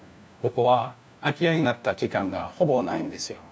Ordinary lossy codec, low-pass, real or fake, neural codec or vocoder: none; none; fake; codec, 16 kHz, 1 kbps, FunCodec, trained on LibriTTS, 50 frames a second